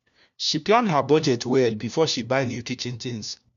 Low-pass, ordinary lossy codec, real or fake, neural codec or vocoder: 7.2 kHz; none; fake; codec, 16 kHz, 1 kbps, FunCodec, trained on LibriTTS, 50 frames a second